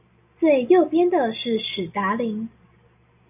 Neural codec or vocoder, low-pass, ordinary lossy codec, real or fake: none; 3.6 kHz; AAC, 32 kbps; real